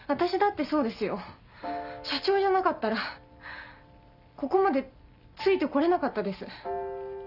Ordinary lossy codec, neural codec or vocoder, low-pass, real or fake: none; none; 5.4 kHz; real